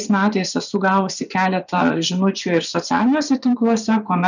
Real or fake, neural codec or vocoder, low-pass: fake; vocoder, 24 kHz, 100 mel bands, Vocos; 7.2 kHz